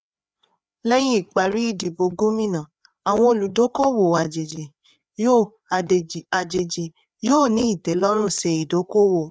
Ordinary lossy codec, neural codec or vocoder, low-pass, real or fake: none; codec, 16 kHz, 4 kbps, FreqCodec, larger model; none; fake